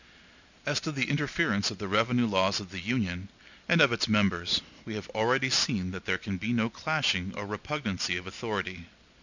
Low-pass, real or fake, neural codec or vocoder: 7.2 kHz; real; none